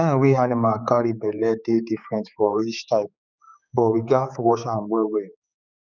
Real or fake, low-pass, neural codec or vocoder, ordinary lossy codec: fake; 7.2 kHz; codec, 16 kHz, 4 kbps, X-Codec, HuBERT features, trained on general audio; none